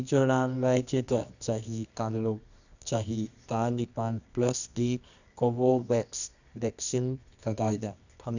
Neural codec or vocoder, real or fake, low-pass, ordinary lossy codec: codec, 24 kHz, 0.9 kbps, WavTokenizer, medium music audio release; fake; 7.2 kHz; none